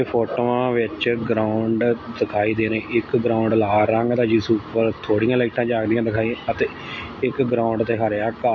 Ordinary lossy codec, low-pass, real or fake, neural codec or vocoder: MP3, 32 kbps; 7.2 kHz; real; none